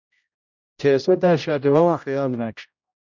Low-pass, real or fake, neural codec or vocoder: 7.2 kHz; fake; codec, 16 kHz, 0.5 kbps, X-Codec, HuBERT features, trained on general audio